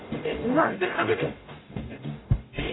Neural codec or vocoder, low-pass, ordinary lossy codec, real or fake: codec, 44.1 kHz, 0.9 kbps, DAC; 7.2 kHz; AAC, 16 kbps; fake